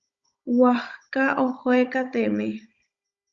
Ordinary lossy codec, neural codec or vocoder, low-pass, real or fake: Opus, 64 kbps; codec, 16 kHz, 16 kbps, FunCodec, trained on Chinese and English, 50 frames a second; 7.2 kHz; fake